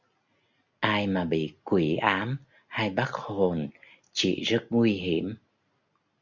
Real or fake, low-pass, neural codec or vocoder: real; 7.2 kHz; none